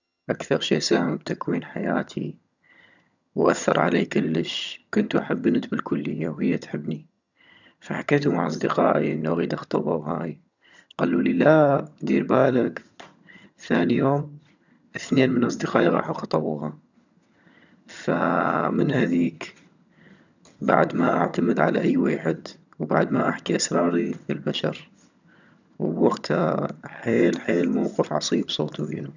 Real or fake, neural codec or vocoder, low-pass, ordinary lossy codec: fake; vocoder, 22.05 kHz, 80 mel bands, HiFi-GAN; 7.2 kHz; none